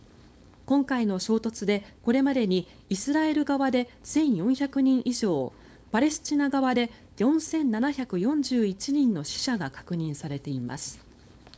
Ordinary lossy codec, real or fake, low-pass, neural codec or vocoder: none; fake; none; codec, 16 kHz, 4.8 kbps, FACodec